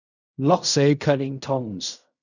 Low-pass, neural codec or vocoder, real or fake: 7.2 kHz; codec, 16 kHz in and 24 kHz out, 0.4 kbps, LongCat-Audio-Codec, fine tuned four codebook decoder; fake